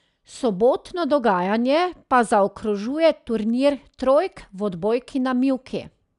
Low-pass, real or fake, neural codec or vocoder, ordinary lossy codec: 10.8 kHz; real; none; none